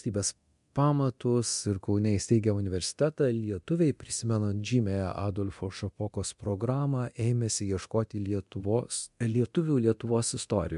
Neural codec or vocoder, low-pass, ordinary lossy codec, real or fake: codec, 24 kHz, 0.9 kbps, DualCodec; 10.8 kHz; MP3, 64 kbps; fake